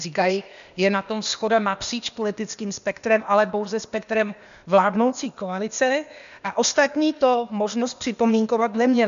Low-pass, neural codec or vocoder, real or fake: 7.2 kHz; codec, 16 kHz, 0.8 kbps, ZipCodec; fake